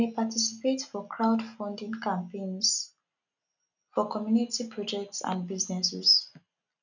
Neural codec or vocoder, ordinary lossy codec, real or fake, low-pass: none; none; real; 7.2 kHz